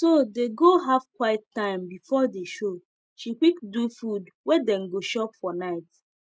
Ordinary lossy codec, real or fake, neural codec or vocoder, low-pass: none; real; none; none